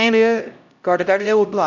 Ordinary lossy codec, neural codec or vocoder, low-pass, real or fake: none; codec, 16 kHz, 0.5 kbps, X-Codec, HuBERT features, trained on LibriSpeech; 7.2 kHz; fake